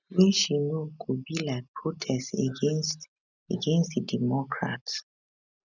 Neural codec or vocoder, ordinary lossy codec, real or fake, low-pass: none; none; real; 7.2 kHz